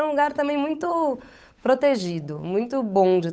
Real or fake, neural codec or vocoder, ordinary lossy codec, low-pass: fake; codec, 16 kHz, 8 kbps, FunCodec, trained on Chinese and English, 25 frames a second; none; none